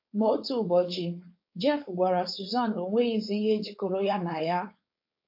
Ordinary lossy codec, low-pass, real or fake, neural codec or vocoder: MP3, 32 kbps; 5.4 kHz; fake; codec, 16 kHz, 4.8 kbps, FACodec